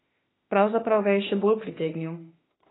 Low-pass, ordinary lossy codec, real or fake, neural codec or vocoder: 7.2 kHz; AAC, 16 kbps; fake; autoencoder, 48 kHz, 32 numbers a frame, DAC-VAE, trained on Japanese speech